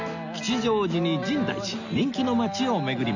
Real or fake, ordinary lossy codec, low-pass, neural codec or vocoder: real; none; 7.2 kHz; none